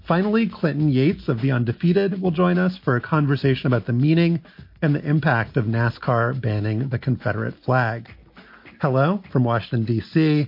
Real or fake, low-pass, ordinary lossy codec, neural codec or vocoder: real; 5.4 kHz; MP3, 32 kbps; none